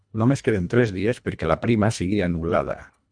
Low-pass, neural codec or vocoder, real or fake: 9.9 kHz; codec, 24 kHz, 1.5 kbps, HILCodec; fake